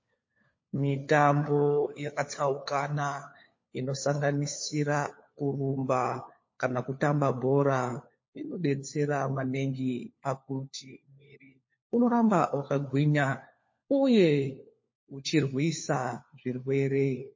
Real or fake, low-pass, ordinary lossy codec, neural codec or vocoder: fake; 7.2 kHz; MP3, 32 kbps; codec, 16 kHz, 4 kbps, FunCodec, trained on LibriTTS, 50 frames a second